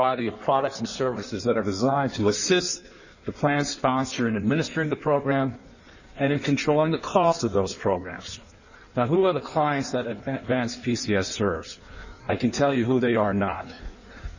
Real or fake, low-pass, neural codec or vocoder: fake; 7.2 kHz; codec, 16 kHz in and 24 kHz out, 1.1 kbps, FireRedTTS-2 codec